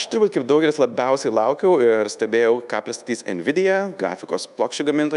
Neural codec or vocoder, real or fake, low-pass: codec, 24 kHz, 1.2 kbps, DualCodec; fake; 10.8 kHz